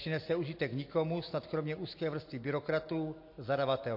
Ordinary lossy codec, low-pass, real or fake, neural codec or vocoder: MP3, 32 kbps; 5.4 kHz; real; none